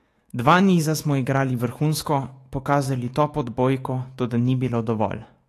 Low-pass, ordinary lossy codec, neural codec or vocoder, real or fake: 14.4 kHz; AAC, 48 kbps; autoencoder, 48 kHz, 128 numbers a frame, DAC-VAE, trained on Japanese speech; fake